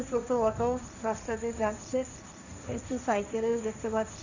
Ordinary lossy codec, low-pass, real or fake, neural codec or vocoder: none; 7.2 kHz; fake; codec, 16 kHz, 1.1 kbps, Voila-Tokenizer